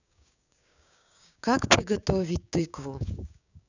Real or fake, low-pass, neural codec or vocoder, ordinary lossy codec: fake; 7.2 kHz; codec, 16 kHz, 6 kbps, DAC; none